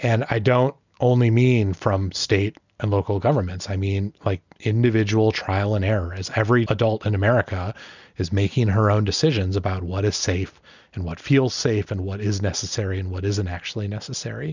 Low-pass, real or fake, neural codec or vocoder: 7.2 kHz; real; none